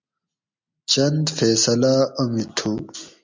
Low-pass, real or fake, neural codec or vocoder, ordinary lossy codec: 7.2 kHz; real; none; MP3, 64 kbps